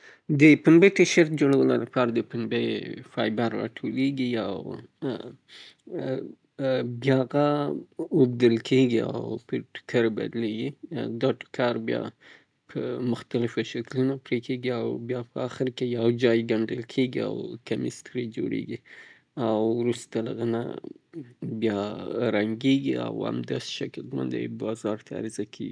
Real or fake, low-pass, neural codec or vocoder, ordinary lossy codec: real; 9.9 kHz; none; none